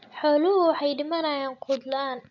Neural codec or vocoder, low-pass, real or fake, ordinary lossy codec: none; 7.2 kHz; real; none